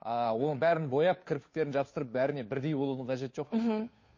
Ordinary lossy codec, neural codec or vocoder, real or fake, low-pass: MP3, 32 kbps; codec, 16 kHz, 2 kbps, FunCodec, trained on Chinese and English, 25 frames a second; fake; 7.2 kHz